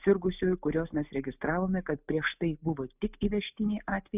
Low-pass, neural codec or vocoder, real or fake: 3.6 kHz; none; real